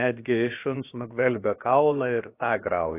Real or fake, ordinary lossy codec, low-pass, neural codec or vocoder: fake; AAC, 24 kbps; 3.6 kHz; codec, 16 kHz, about 1 kbps, DyCAST, with the encoder's durations